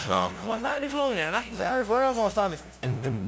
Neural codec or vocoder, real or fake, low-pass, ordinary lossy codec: codec, 16 kHz, 0.5 kbps, FunCodec, trained on LibriTTS, 25 frames a second; fake; none; none